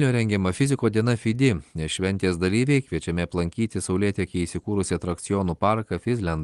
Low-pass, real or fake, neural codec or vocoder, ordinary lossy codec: 10.8 kHz; real; none; Opus, 24 kbps